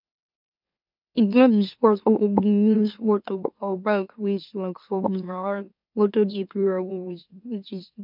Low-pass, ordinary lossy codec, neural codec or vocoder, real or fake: 5.4 kHz; none; autoencoder, 44.1 kHz, a latent of 192 numbers a frame, MeloTTS; fake